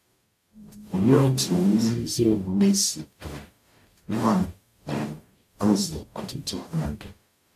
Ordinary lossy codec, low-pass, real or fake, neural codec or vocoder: AAC, 96 kbps; 14.4 kHz; fake; codec, 44.1 kHz, 0.9 kbps, DAC